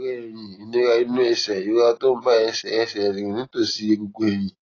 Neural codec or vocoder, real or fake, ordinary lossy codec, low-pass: none; real; AAC, 32 kbps; 7.2 kHz